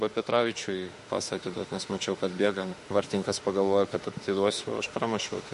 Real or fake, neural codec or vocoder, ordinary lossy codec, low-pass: fake; autoencoder, 48 kHz, 32 numbers a frame, DAC-VAE, trained on Japanese speech; MP3, 48 kbps; 14.4 kHz